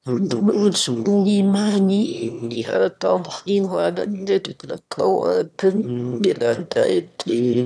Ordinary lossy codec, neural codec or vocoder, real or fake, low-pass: none; autoencoder, 22.05 kHz, a latent of 192 numbers a frame, VITS, trained on one speaker; fake; none